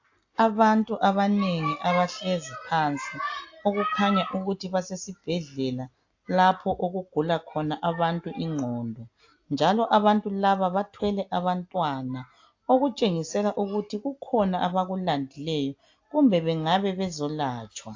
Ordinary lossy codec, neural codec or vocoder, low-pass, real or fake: AAC, 48 kbps; none; 7.2 kHz; real